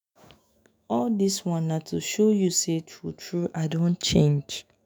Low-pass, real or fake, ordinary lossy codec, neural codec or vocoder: none; fake; none; autoencoder, 48 kHz, 128 numbers a frame, DAC-VAE, trained on Japanese speech